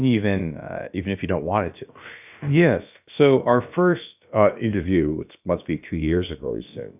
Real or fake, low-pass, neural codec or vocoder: fake; 3.6 kHz; codec, 16 kHz, about 1 kbps, DyCAST, with the encoder's durations